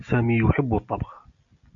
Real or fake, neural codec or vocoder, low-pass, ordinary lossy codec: real; none; 7.2 kHz; MP3, 96 kbps